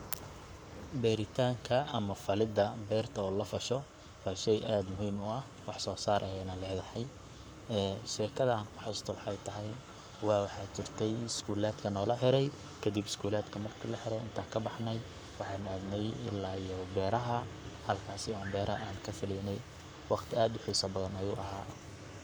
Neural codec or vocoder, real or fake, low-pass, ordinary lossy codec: codec, 44.1 kHz, 7.8 kbps, Pupu-Codec; fake; 19.8 kHz; none